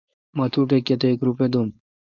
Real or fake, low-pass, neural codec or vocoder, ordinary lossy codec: fake; 7.2 kHz; codec, 16 kHz, 4.8 kbps, FACodec; Opus, 64 kbps